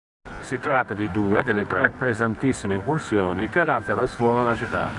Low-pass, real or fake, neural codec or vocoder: 10.8 kHz; fake; codec, 24 kHz, 0.9 kbps, WavTokenizer, medium music audio release